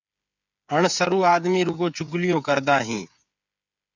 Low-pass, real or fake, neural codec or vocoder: 7.2 kHz; fake; codec, 16 kHz, 8 kbps, FreqCodec, smaller model